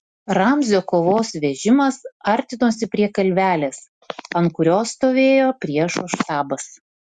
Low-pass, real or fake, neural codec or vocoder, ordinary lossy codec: 10.8 kHz; real; none; Opus, 64 kbps